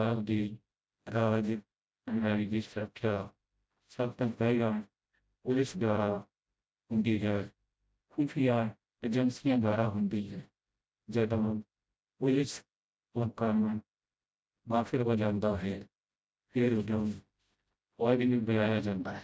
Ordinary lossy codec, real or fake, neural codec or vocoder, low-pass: none; fake; codec, 16 kHz, 0.5 kbps, FreqCodec, smaller model; none